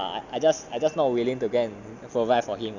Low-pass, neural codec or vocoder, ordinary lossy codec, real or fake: 7.2 kHz; none; none; real